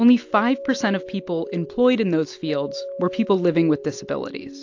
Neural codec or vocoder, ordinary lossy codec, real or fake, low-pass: none; AAC, 48 kbps; real; 7.2 kHz